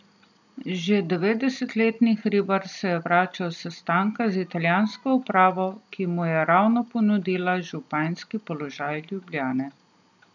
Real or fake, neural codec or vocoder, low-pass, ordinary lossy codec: real; none; 7.2 kHz; none